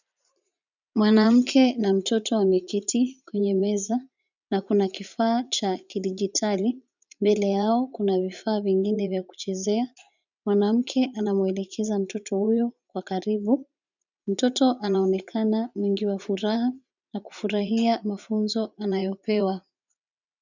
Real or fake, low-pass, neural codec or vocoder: fake; 7.2 kHz; vocoder, 44.1 kHz, 80 mel bands, Vocos